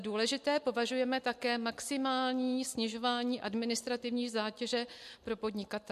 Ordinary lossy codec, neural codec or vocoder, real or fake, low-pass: MP3, 64 kbps; none; real; 14.4 kHz